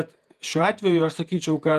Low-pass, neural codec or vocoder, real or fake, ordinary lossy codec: 14.4 kHz; vocoder, 48 kHz, 128 mel bands, Vocos; fake; Opus, 32 kbps